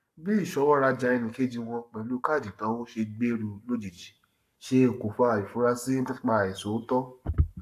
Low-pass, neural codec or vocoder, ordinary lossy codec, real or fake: 14.4 kHz; codec, 44.1 kHz, 7.8 kbps, Pupu-Codec; AAC, 96 kbps; fake